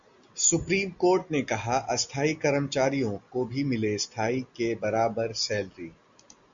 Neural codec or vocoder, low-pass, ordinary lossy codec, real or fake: none; 7.2 kHz; Opus, 64 kbps; real